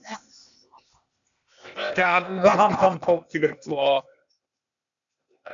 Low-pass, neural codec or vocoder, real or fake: 7.2 kHz; codec, 16 kHz, 0.8 kbps, ZipCodec; fake